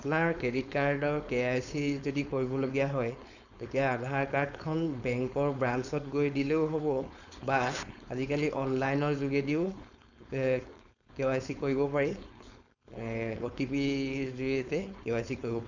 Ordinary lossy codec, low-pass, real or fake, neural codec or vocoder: none; 7.2 kHz; fake; codec, 16 kHz, 4.8 kbps, FACodec